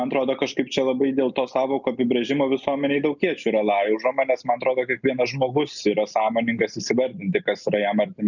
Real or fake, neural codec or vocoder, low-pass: real; none; 7.2 kHz